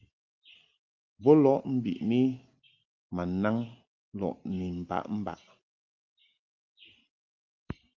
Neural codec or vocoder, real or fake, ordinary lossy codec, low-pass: none; real; Opus, 24 kbps; 7.2 kHz